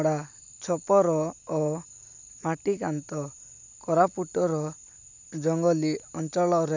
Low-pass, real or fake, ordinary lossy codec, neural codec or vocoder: 7.2 kHz; real; none; none